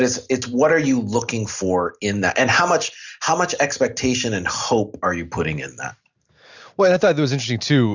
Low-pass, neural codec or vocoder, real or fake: 7.2 kHz; none; real